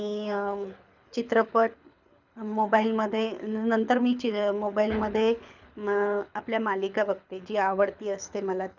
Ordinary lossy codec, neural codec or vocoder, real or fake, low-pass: none; codec, 24 kHz, 6 kbps, HILCodec; fake; 7.2 kHz